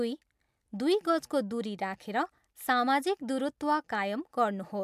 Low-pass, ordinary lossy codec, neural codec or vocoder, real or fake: 14.4 kHz; MP3, 96 kbps; none; real